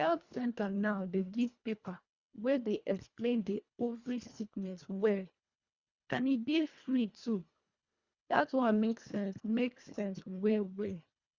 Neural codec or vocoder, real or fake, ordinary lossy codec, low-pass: codec, 24 kHz, 1.5 kbps, HILCodec; fake; Opus, 64 kbps; 7.2 kHz